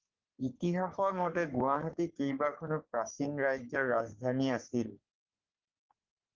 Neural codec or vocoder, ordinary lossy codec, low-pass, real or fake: codec, 44.1 kHz, 3.4 kbps, Pupu-Codec; Opus, 16 kbps; 7.2 kHz; fake